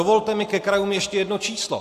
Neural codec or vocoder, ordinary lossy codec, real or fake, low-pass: none; AAC, 64 kbps; real; 14.4 kHz